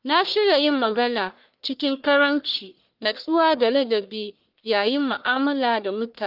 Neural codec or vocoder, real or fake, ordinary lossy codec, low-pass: codec, 44.1 kHz, 1.7 kbps, Pupu-Codec; fake; Opus, 24 kbps; 5.4 kHz